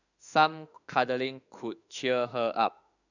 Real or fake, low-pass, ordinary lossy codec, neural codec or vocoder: fake; 7.2 kHz; none; autoencoder, 48 kHz, 32 numbers a frame, DAC-VAE, trained on Japanese speech